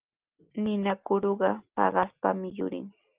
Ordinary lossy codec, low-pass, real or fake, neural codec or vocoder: Opus, 32 kbps; 3.6 kHz; real; none